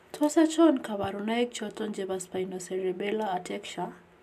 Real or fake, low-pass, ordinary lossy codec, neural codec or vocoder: real; 14.4 kHz; AAC, 96 kbps; none